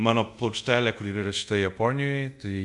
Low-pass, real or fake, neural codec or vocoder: 10.8 kHz; fake; codec, 24 kHz, 0.5 kbps, DualCodec